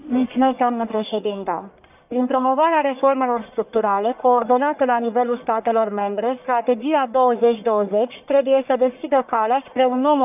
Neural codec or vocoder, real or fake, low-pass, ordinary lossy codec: codec, 44.1 kHz, 1.7 kbps, Pupu-Codec; fake; 3.6 kHz; none